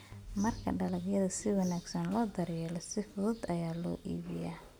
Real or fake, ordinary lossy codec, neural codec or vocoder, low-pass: real; none; none; none